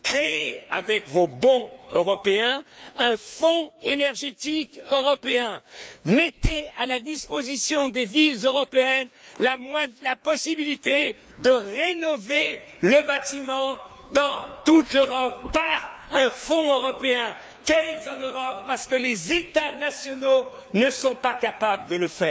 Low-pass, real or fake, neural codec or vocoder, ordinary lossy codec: none; fake; codec, 16 kHz, 2 kbps, FreqCodec, larger model; none